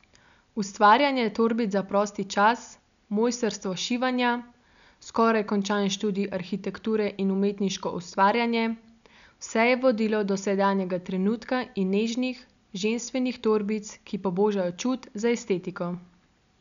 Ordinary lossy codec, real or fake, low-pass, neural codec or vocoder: none; real; 7.2 kHz; none